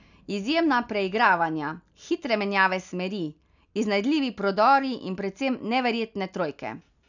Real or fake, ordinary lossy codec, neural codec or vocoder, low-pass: real; none; none; 7.2 kHz